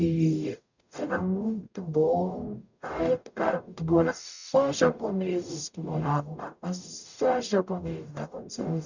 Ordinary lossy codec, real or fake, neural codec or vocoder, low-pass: none; fake; codec, 44.1 kHz, 0.9 kbps, DAC; 7.2 kHz